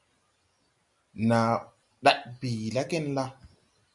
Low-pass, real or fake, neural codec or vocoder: 10.8 kHz; real; none